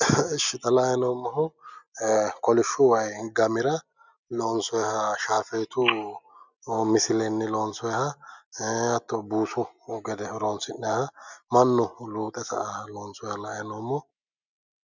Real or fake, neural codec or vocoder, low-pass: real; none; 7.2 kHz